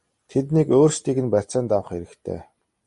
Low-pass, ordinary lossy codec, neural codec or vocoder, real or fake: 14.4 kHz; MP3, 48 kbps; none; real